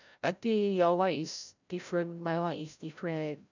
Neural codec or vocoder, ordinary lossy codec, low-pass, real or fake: codec, 16 kHz, 0.5 kbps, FreqCodec, larger model; none; 7.2 kHz; fake